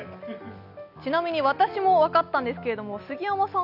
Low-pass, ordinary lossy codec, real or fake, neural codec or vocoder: 5.4 kHz; none; real; none